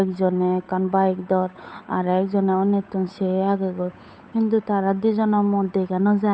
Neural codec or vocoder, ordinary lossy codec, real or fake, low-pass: codec, 16 kHz, 8 kbps, FunCodec, trained on Chinese and English, 25 frames a second; none; fake; none